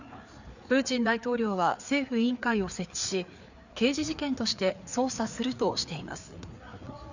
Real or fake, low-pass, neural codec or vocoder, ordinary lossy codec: fake; 7.2 kHz; codec, 16 kHz, 4 kbps, FreqCodec, larger model; none